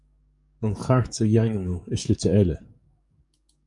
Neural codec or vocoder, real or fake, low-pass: codec, 44.1 kHz, 7.8 kbps, DAC; fake; 10.8 kHz